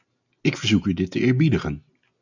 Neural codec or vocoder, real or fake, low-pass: none; real; 7.2 kHz